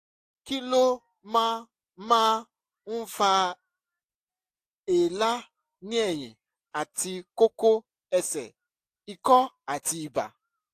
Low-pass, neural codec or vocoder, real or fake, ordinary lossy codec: 14.4 kHz; none; real; AAC, 64 kbps